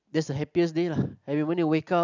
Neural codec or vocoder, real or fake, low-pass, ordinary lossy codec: none; real; 7.2 kHz; none